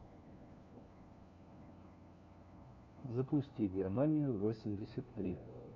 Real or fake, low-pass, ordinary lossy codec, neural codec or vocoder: fake; 7.2 kHz; MP3, 48 kbps; codec, 16 kHz, 1 kbps, FunCodec, trained on LibriTTS, 50 frames a second